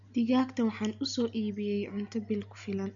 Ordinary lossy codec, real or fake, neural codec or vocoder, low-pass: none; real; none; 7.2 kHz